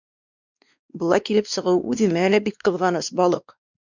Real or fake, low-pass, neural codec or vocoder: fake; 7.2 kHz; codec, 16 kHz, 2 kbps, X-Codec, WavLM features, trained on Multilingual LibriSpeech